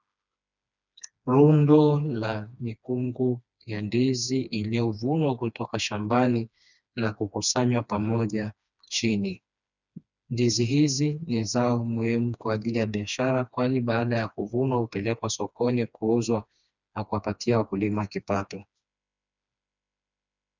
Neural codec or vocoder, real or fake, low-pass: codec, 16 kHz, 2 kbps, FreqCodec, smaller model; fake; 7.2 kHz